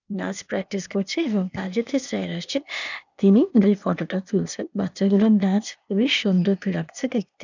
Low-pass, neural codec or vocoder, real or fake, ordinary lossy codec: 7.2 kHz; codec, 16 kHz, 0.8 kbps, ZipCodec; fake; none